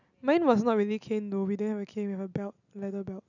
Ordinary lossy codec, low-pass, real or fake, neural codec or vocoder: none; 7.2 kHz; real; none